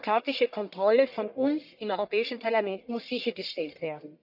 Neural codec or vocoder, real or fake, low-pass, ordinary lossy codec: codec, 44.1 kHz, 1.7 kbps, Pupu-Codec; fake; 5.4 kHz; none